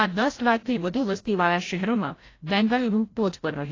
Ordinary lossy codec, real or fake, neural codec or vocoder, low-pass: AAC, 32 kbps; fake; codec, 16 kHz, 0.5 kbps, FreqCodec, larger model; 7.2 kHz